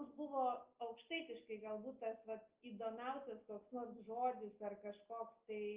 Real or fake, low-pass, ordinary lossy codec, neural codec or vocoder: real; 3.6 kHz; Opus, 24 kbps; none